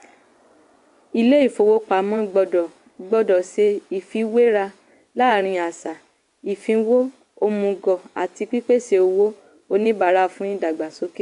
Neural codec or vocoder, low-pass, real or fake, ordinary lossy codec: vocoder, 24 kHz, 100 mel bands, Vocos; 10.8 kHz; fake; AAC, 64 kbps